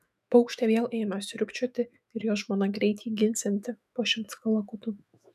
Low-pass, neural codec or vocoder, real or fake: 14.4 kHz; autoencoder, 48 kHz, 128 numbers a frame, DAC-VAE, trained on Japanese speech; fake